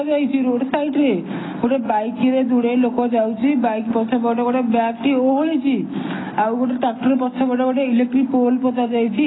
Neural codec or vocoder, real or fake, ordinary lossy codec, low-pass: none; real; AAC, 16 kbps; 7.2 kHz